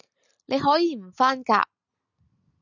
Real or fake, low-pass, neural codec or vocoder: real; 7.2 kHz; none